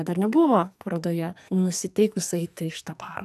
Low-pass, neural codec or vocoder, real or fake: 14.4 kHz; codec, 44.1 kHz, 2.6 kbps, SNAC; fake